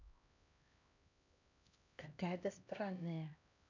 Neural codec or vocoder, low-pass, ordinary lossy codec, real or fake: codec, 16 kHz, 1 kbps, X-Codec, HuBERT features, trained on LibriSpeech; 7.2 kHz; none; fake